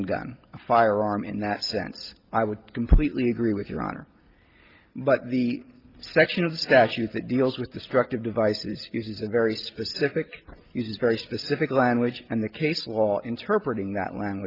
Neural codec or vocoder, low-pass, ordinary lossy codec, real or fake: none; 5.4 kHz; Opus, 24 kbps; real